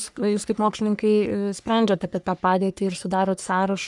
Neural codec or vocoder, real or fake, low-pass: codec, 44.1 kHz, 3.4 kbps, Pupu-Codec; fake; 10.8 kHz